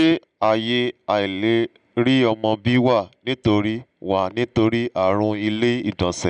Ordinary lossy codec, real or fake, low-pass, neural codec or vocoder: none; real; 9.9 kHz; none